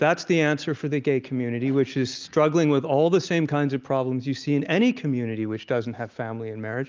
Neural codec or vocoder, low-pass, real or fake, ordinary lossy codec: none; 7.2 kHz; real; Opus, 24 kbps